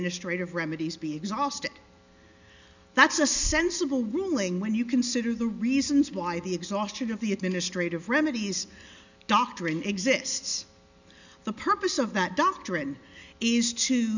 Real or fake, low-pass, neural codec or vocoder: real; 7.2 kHz; none